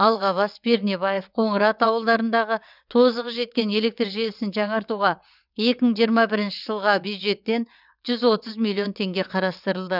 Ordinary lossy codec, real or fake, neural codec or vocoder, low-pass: none; fake; vocoder, 22.05 kHz, 80 mel bands, WaveNeXt; 5.4 kHz